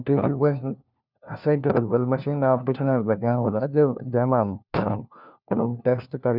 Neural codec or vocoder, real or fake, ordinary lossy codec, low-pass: codec, 16 kHz, 1 kbps, FunCodec, trained on LibriTTS, 50 frames a second; fake; none; 5.4 kHz